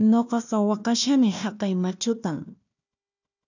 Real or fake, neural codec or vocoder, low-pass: fake; codec, 16 kHz, 1 kbps, FunCodec, trained on Chinese and English, 50 frames a second; 7.2 kHz